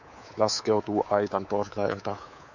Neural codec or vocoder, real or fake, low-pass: codec, 24 kHz, 3.1 kbps, DualCodec; fake; 7.2 kHz